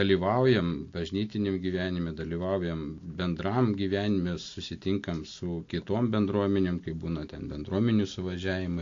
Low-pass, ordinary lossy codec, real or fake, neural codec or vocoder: 7.2 kHz; AAC, 48 kbps; real; none